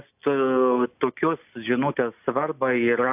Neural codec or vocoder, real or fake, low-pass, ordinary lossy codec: none; real; 3.6 kHz; AAC, 32 kbps